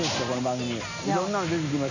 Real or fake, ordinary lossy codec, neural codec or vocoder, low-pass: real; none; none; 7.2 kHz